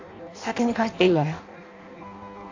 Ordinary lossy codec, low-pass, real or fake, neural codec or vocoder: AAC, 48 kbps; 7.2 kHz; fake; codec, 16 kHz in and 24 kHz out, 0.6 kbps, FireRedTTS-2 codec